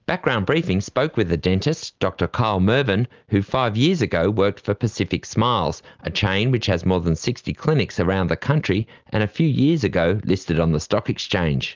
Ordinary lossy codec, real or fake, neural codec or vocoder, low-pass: Opus, 24 kbps; real; none; 7.2 kHz